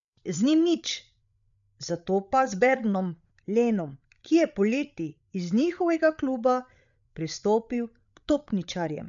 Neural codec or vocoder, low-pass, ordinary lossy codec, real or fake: codec, 16 kHz, 8 kbps, FreqCodec, larger model; 7.2 kHz; none; fake